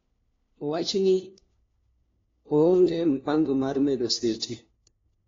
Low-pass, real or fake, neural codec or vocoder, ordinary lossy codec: 7.2 kHz; fake; codec, 16 kHz, 1 kbps, FunCodec, trained on LibriTTS, 50 frames a second; AAC, 32 kbps